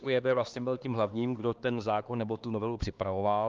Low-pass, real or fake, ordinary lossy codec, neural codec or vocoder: 7.2 kHz; fake; Opus, 32 kbps; codec, 16 kHz, 2 kbps, X-Codec, HuBERT features, trained on LibriSpeech